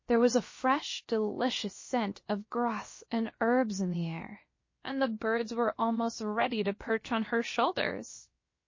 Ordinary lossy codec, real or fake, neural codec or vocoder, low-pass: MP3, 32 kbps; fake; codec, 16 kHz, about 1 kbps, DyCAST, with the encoder's durations; 7.2 kHz